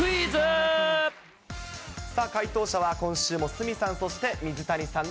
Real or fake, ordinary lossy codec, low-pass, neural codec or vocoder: real; none; none; none